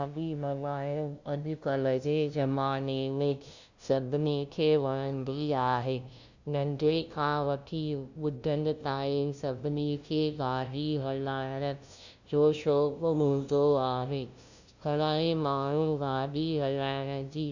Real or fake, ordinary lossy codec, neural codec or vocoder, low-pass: fake; Opus, 64 kbps; codec, 16 kHz, 0.5 kbps, FunCodec, trained on LibriTTS, 25 frames a second; 7.2 kHz